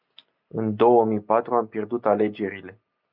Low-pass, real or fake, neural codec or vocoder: 5.4 kHz; real; none